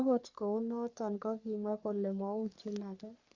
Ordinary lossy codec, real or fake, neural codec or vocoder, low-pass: Opus, 64 kbps; fake; codec, 44.1 kHz, 3.4 kbps, Pupu-Codec; 7.2 kHz